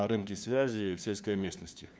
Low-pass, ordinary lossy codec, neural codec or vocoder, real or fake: none; none; codec, 16 kHz, 2 kbps, FunCodec, trained on LibriTTS, 25 frames a second; fake